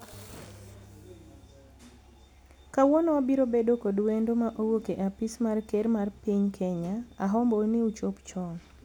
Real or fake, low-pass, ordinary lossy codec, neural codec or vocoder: real; none; none; none